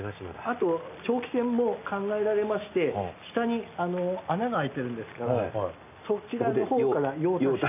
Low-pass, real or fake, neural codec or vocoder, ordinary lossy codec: 3.6 kHz; real; none; none